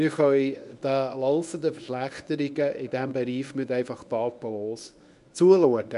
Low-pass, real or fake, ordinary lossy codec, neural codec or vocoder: 10.8 kHz; fake; none; codec, 24 kHz, 0.9 kbps, WavTokenizer, medium speech release version 1